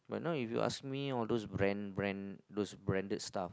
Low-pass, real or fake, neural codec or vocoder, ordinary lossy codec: none; real; none; none